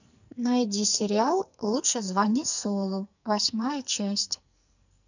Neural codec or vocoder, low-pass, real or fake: codec, 44.1 kHz, 2.6 kbps, SNAC; 7.2 kHz; fake